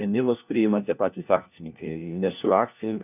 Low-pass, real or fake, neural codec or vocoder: 3.6 kHz; fake; codec, 16 kHz, 1 kbps, FunCodec, trained on LibriTTS, 50 frames a second